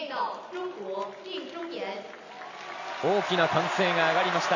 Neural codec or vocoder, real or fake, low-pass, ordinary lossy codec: none; real; 7.2 kHz; AAC, 48 kbps